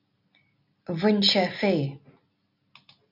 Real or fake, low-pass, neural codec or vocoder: real; 5.4 kHz; none